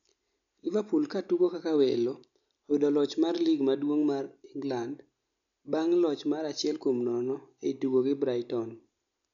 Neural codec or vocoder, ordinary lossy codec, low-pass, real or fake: none; none; 7.2 kHz; real